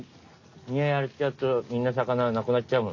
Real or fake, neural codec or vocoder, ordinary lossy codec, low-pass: real; none; none; 7.2 kHz